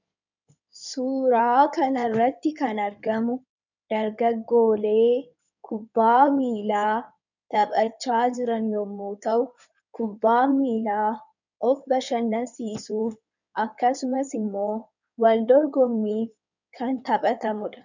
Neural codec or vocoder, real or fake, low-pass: codec, 16 kHz in and 24 kHz out, 2.2 kbps, FireRedTTS-2 codec; fake; 7.2 kHz